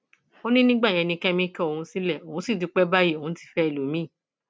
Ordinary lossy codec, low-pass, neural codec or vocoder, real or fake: none; none; none; real